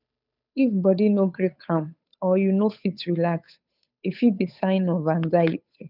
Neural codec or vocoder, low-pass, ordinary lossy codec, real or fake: codec, 16 kHz, 8 kbps, FunCodec, trained on Chinese and English, 25 frames a second; 5.4 kHz; none; fake